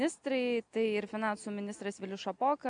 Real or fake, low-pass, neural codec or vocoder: real; 9.9 kHz; none